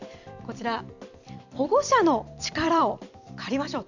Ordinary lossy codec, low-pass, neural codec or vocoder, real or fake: none; 7.2 kHz; vocoder, 44.1 kHz, 128 mel bands every 256 samples, BigVGAN v2; fake